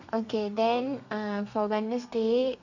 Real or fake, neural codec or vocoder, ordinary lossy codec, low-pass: fake; codec, 32 kHz, 1.9 kbps, SNAC; none; 7.2 kHz